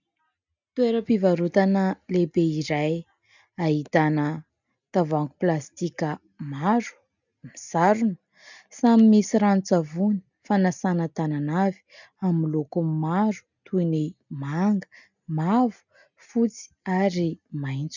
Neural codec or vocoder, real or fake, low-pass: none; real; 7.2 kHz